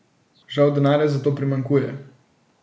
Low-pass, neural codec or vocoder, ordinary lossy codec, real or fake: none; none; none; real